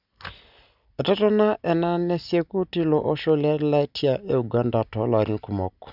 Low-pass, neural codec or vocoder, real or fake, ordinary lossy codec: 5.4 kHz; none; real; none